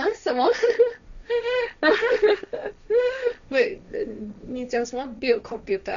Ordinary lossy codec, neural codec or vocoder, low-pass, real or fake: none; codec, 16 kHz, 1.1 kbps, Voila-Tokenizer; 7.2 kHz; fake